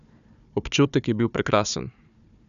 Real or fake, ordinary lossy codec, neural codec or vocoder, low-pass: fake; none; codec, 16 kHz, 4 kbps, FunCodec, trained on Chinese and English, 50 frames a second; 7.2 kHz